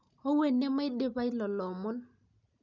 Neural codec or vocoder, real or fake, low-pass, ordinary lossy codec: none; real; 7.2 kHz; none